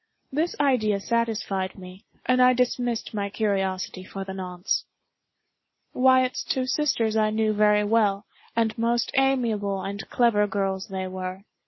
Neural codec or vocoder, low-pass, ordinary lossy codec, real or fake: none; 7.2 kHz; MP3, 24 kbps; real